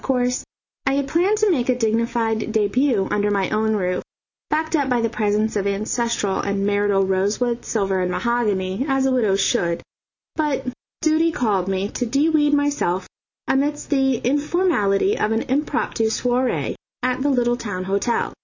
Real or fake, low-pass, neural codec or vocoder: real; 7.2 kHz; none